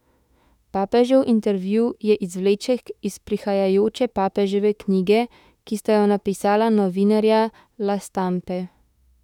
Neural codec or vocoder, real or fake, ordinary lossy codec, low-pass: autoencoder, 48 kHz, 32 numbers a frame, DAC-VAE, trained on Japanese speech; fake; none; 19.8 kHz